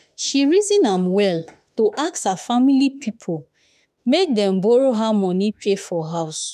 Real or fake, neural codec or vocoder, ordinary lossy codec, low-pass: fake; autoencoder, 48 kHz, 32 numbers a frame, DAC-VAE, trained on Japanese speech; none; 14.4 kHz